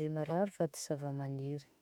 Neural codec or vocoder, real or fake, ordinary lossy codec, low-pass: autoencoder, 48 kHz, 32 numbers a frame, DAC-VAE, trained on Japanese speech; fake; none; 19.8 kHz